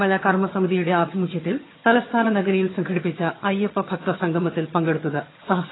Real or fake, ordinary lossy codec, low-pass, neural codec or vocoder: fake; AAC, 16 kbps; 7.2 kHz; vocoder, 22.05 kHz, 80 mel bands, HiFi-GAN